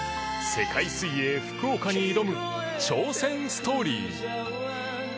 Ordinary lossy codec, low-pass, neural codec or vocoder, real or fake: none; none; none; real